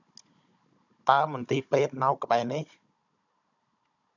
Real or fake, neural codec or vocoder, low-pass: fake; codec, 16 kHz, 16 kbps, FunCodec, trained on Chinese and English, 50 frames a second; 7.2 kHz